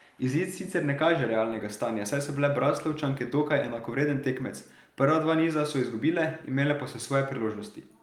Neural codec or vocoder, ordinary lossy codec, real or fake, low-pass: none; Opus, 32 kbps; real; 19.8 kHz